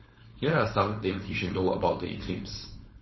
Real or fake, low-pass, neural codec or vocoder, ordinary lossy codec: fake; 7.2 kHz; codec, 16 kHz, 4.8 kbps, FACodec; MP3, 24 kbps